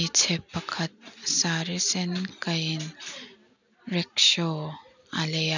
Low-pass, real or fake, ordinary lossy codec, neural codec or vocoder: 7.2 kHz; real; none; none